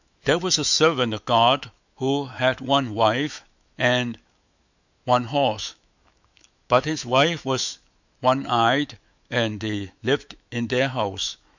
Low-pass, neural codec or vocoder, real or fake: 7.2 kHz; autoencoder, 48 kHz, 128 numbers a frame, DAC-VAE, trained on Japanese speech; fake